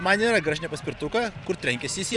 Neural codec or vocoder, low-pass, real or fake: vocoder, 44.1 kHz, 128 mel bands every 512 samples, BigVGAN v2; 10.8 kHz; fake